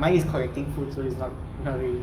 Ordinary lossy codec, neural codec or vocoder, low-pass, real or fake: Opus, 32 kbps; codec, 44.1 kHz, 7.8 kbps, DAC; 19.8 kHz; fake